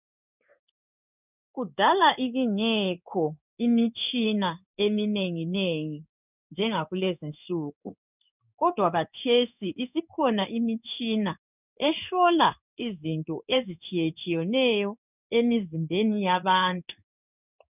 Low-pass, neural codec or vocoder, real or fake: 3.6 kHz; codec, 16 kHz in and 24 kHz out, 1 kbps, XY-Tokenizer; fake